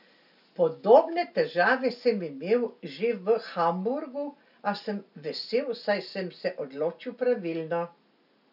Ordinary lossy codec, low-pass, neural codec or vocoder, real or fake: none; 5.4 kHz; none; real